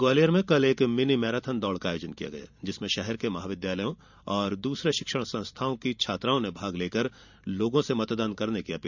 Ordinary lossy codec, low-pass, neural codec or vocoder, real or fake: none; 7.2 kHz; vocoder, 44.1 kHz, 128 mel bands every 512 samples, BigVGAN v2; fake